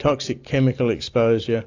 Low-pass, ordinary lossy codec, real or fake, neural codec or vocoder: 7.2 kHz; AAC, 48 kbps; real; none